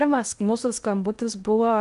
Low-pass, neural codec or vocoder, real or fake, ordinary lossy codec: 10.8 kHz; codec, 16 kHz in and 24 kHz out, 0.6 kbps, FocalCodec, streaming, 2048 codes; fake; AAC, 96 kbps